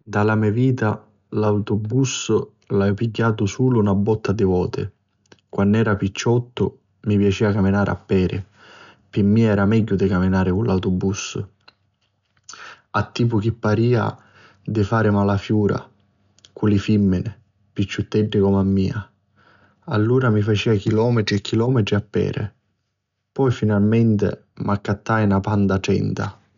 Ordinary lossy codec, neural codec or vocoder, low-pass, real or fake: none; none; 7.2 kHz; real